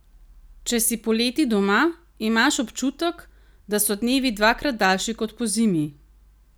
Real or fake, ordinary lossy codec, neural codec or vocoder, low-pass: real; none; none; none